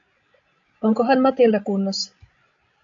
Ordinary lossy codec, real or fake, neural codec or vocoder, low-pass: AAC, 64 kbps; fake; codec, 16 kHz, 16 kbps, FreqCodec, larger model; 7.2 kHz